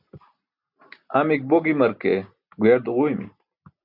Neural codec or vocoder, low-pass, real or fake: none; 5.4 kHz; real